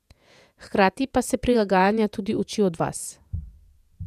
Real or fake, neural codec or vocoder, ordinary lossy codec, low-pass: fake; vocoder, 48 kHz, 128 mel bands, Vocos; none; 14.4 kHz